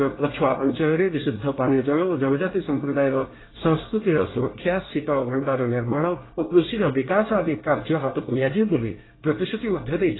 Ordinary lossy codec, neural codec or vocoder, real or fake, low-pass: AAC, 16 kbps; codec, 24 kHz, 1 kbps, SNAC; fake; 7.2 kHz